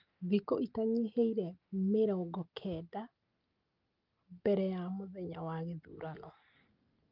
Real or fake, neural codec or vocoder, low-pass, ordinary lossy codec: real; none; 5.4 kHz; Opus, 24 kbps